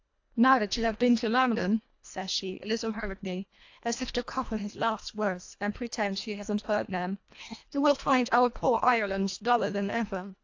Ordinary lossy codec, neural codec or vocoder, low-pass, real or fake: AAC, 48 kbps; codec, 24 kHz, 1.5 kbps, HILCodec; 7.2 kHz; fake